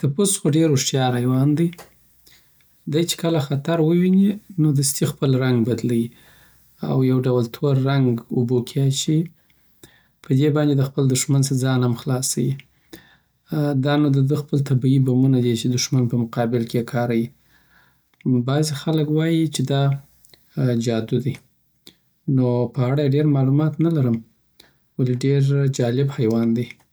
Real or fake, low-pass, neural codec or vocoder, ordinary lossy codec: real; none; none; none